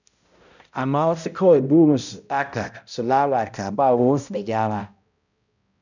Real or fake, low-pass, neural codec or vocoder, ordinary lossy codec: fake; 7.2 kHz; codec, 16 kHz, 0.5 kbps, X-Codec, HuBERT features, trained on balanced general audio; none